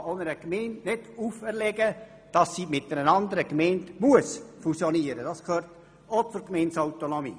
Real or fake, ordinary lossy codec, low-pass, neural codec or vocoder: real; none; none; none